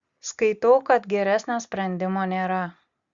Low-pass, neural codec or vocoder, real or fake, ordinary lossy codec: 7.2 kHz; none; real; Opus, 64 kbps